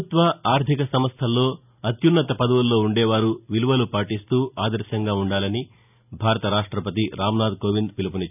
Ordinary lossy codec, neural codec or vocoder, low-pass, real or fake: none; none; 3.6 kHz; real